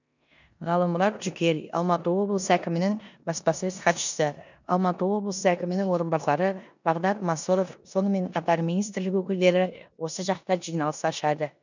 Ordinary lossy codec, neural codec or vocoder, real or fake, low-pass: none; codec, 16 kHz in and 24 kHz out, 0.9 kbps, LongCat-Audio-Codec, four codebook decoder; fake; 7.2 kHz